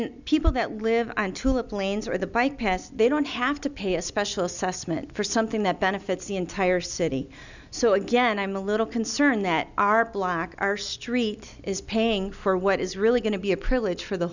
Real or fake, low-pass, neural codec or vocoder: real; 7.2 kHz; none